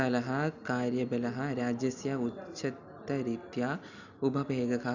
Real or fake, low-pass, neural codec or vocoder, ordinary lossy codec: real; 7.2 kHz; none; none